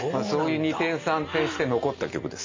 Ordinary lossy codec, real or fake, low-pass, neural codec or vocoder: MP3, 48 kbps; real; 7.2 kHz; none